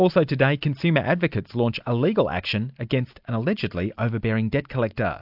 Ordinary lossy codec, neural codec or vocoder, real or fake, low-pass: AAC, 48 kbps; none; real; 5.4 kHz